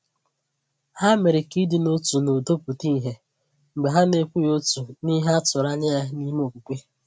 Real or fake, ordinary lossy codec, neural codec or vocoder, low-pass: real; none; none; none